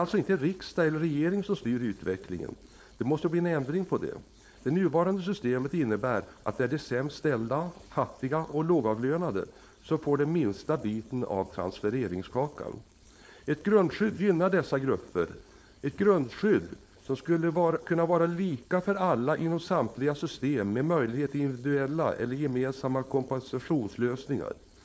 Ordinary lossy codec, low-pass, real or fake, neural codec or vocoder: none; none; fake; codec, 16 kHz, 4.8 kbps, FACodec